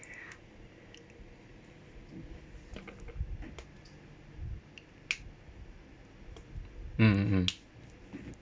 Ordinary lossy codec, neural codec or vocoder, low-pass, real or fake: none; none; none; real